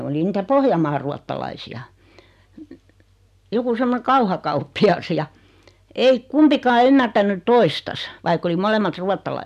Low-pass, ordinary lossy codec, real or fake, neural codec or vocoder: 14.4 kHz; none; real; none